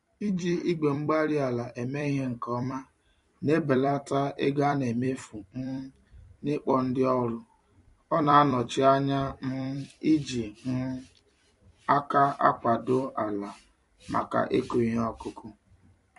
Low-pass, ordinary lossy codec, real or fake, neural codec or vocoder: 14.4 kHz; MP3, 48 kbps; fake; vocoder, 44.1 kHz, 128 mel bands every 256 samples, BigVGAN v2